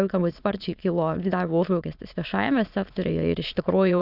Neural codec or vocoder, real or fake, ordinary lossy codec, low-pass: autoencoder, 22.05 kHz, a latent of 192 numbers a frame, VITS, trained on many speakers; fake; Opus, 64 kbps; 5.4 kHz